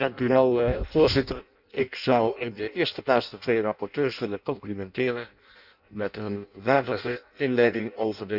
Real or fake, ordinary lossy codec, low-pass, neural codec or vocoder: fake; none; 5.4 kHz; codec, 16 kHz in and 24 kHz out, 0.6 kbps, FireRedTTS-2 codec